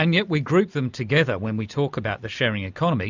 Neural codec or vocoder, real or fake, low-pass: none; real; 7.2 kHz